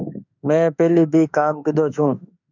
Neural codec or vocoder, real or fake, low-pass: autoencoder, 48 kHz, 32 numbers a frame, DAC-VAE, trained on Japanese speech; fake; 7.2 kHz